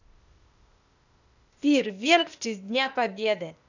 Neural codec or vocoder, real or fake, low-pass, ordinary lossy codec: codec, 16 kHz, 0.8 kbps, ZipCodec; fake; 7.2 kHz; none